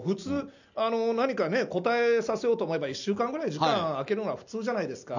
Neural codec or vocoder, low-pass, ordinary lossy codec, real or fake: none; 7.2 kHz; none; real